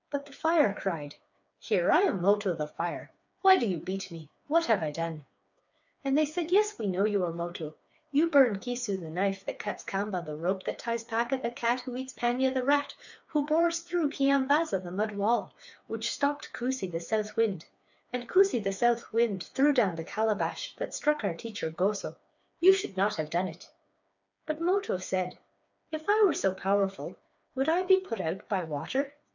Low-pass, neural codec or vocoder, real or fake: 7.2 kHz; codec, 16 kHz, 4 kbps, FreqCodec, smaller model; fake